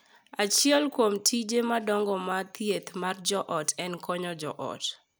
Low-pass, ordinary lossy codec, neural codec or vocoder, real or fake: none; none; none; real